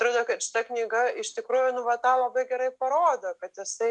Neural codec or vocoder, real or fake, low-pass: none; real; 10.8 kHz